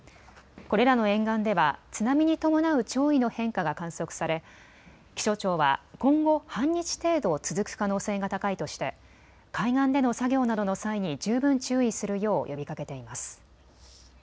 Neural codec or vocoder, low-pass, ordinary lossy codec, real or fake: none; none; none; real